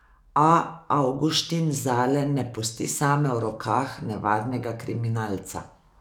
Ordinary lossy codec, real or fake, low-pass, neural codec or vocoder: none; fake; 19.8 kHz; codec, 44.1 kHz, 7.8 kbps, DAC